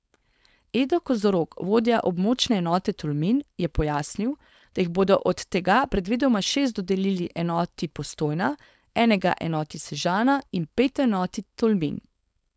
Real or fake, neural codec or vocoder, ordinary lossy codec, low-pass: fake; codec, 16 kHz, 4.8 kbps, FACodec; none; none